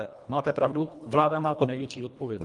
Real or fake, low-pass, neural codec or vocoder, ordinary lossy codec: fake; 10.8 kHz; codec, 24 kHz, 1.5 kbps, HILCodec; Opus, 32 kbps